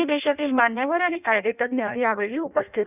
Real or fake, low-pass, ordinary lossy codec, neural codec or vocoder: fake; 3.6 kHz; none; codec, 16 kHz in and 24 kHz out, 0.6 kbps, FireRedTTS-2 codec